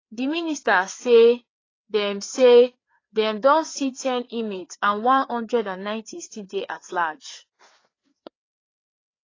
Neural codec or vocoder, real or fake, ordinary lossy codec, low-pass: codec, 16 kHz, 4 kbps, FreqCodec, larger model; fake; AAC, 32 kbps; 7.2 kHz